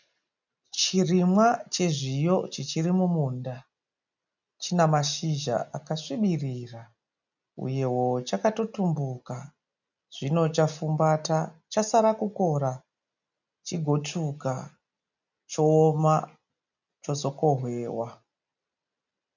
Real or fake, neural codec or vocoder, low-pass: real; none; 7.2 kHz